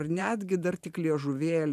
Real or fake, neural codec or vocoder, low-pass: fake; vocoder, 44.1 kHz, 128 mel bands every 512 samples, BigVGAN v2; 14.4 kHz